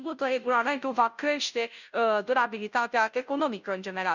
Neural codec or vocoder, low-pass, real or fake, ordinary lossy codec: codec, 16 kHz, 0.5 kbps, FunCodec, trained on Chinese and English, 25 frames a second; 7.2 kHz; fake; none